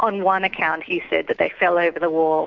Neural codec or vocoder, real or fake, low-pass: autoencoder, 48 kHz, 128 numbers a frame, DAC-VAE, trained on Japanese speech; fake; 7.2 kHz